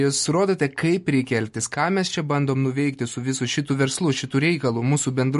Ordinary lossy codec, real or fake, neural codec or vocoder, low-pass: MP3, 48 kbps; real; none; 14.4 kHz